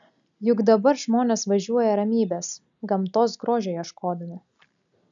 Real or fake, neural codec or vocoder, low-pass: real; none; 7.2 kHz